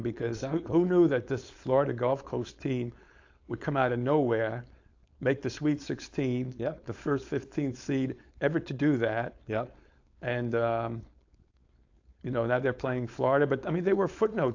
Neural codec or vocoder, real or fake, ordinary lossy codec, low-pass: codec, 16 kHz, 4.8 kbps, FACodec; fake; AAC, 48 kbps; 7.2 kHz